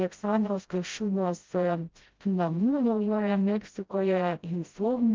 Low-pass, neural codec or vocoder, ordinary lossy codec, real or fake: 7.2 kHz; codec, 16 kHz, 0.5 kbps, FreqCodec, smaller model; Opus, 32 kbps; fake